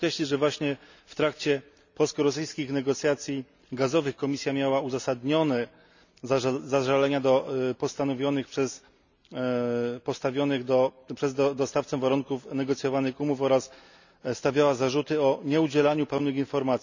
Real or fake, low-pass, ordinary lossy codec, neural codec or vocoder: real; 7.2 kHz; none; none